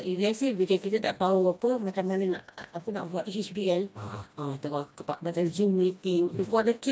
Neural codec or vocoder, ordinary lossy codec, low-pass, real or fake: codec, 16 kHz, 1 kbps, FreqCodec, smaller model; none; none; fake